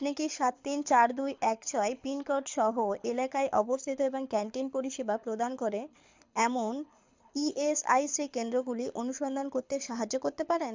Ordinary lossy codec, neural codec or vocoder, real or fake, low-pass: MP3, 64 kbps; codec, 24 kHz, 6 kbps, HILCodec; fake; 7.2 kHz